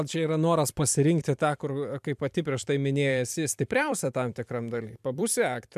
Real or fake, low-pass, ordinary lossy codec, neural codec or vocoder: fake; 14.4 kHz; MP3, 96 kbps; vocoder, 44.1 kHz, 128 mel bands every 256 samples, BigVGAN v2